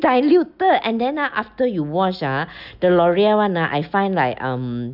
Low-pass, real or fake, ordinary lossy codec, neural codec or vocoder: 5.4 kHz; real; none; none